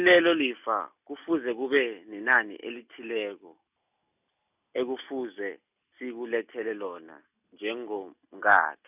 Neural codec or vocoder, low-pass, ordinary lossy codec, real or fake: none; 3.6 kHz; none; real